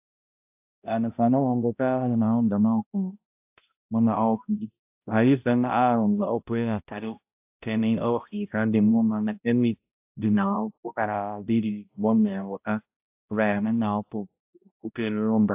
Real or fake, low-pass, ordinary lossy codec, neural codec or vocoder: fake; 3.6 kHz; AAC, 32 kbps; codec, 16 kHz, 0.5 kbps, X-Codec, HuBERT features, trained on balanced general audio